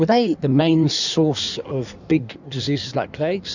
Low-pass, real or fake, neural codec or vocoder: 7.2 kHz; fake; codec, 16 kHz in and 24 kHz out, 1.1 kbps, FireRedTTS-2 codec